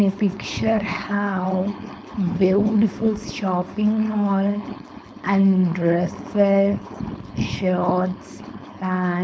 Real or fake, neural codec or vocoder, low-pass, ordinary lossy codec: fake; codec, 16 kHz, 4.8 kbps, FACodec; none; none